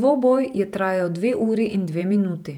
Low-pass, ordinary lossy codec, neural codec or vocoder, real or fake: 19.8 kHz; none; vocoder, 48 kHz, 128 mel bands, Vocos; fake